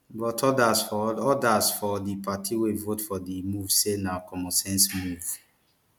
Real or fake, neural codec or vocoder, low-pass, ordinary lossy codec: real; none; 19.8 kHz; none